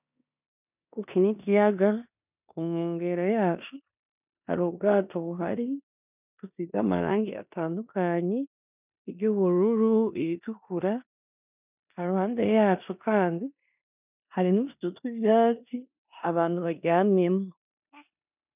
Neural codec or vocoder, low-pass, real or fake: codec, 16 kHz in and 24 kHz out, 0.9 kbps, LongCat-Audio-Codec, four codebook decoder; 3.6 kHz; fake